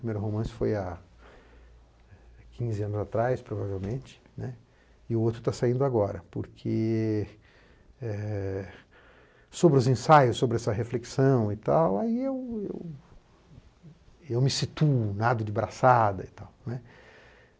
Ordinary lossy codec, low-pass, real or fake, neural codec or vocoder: none; none; real; none